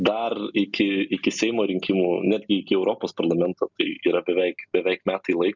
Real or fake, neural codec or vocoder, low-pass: real; none; 7.2 kHz